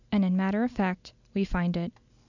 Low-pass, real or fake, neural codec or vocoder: 7.2 kHz; real; none